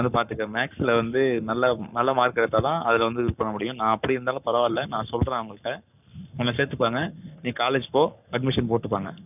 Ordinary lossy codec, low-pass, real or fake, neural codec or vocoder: none; 3.6 kHz; fake; codec, 44.1 kHz, 7.8 kbps, Pupu-Codec